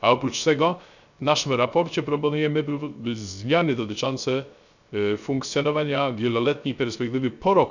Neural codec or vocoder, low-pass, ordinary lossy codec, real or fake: codec, 16 kHz, 0.3 kbps, FocalCodec; 7.2 kHz; none; fake